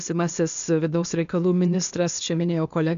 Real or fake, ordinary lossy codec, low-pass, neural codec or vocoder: fake; MP3, 64 kbps; 7.2 kHz; codec, 16 kHz, 0.8 kbps, ZipCodec